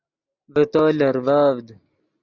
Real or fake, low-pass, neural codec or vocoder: real; 7.2 kHz; none